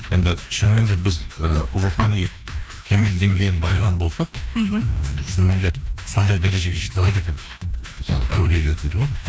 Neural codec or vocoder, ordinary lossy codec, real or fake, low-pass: codec, 16 kHz, 1 kbps, FreqCodec, larger model; none; fake; none